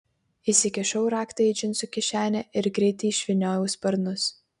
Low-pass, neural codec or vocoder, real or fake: 10.8 kHz; none; real